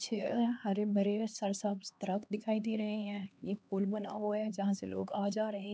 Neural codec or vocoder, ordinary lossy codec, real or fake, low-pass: codec, 16 kHz, 2 kbps, X-Codec, HuBERT features, trained on LibriSpeech; none; fake; none